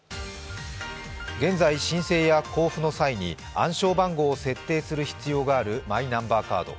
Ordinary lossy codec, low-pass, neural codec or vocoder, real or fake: none; none; none; real